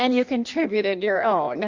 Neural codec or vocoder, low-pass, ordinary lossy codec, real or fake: codec, 16 kHz in and 24 kHz out, 1.1 kbps, FireRedTTS-2 codec; 7.2 kHz; Opus, 64 kbps; fake